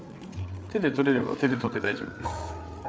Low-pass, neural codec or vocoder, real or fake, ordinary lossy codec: none; codec, 16 kHz, 8 kbps, FreqCodec, larger model; fake; none